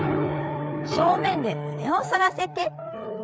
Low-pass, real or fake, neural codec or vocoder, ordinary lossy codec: none; fake; codec, 16 kHz, 4 kbps, FreqCodec, larger model; none